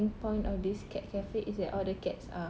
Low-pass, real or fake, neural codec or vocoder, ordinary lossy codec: none; real; none; none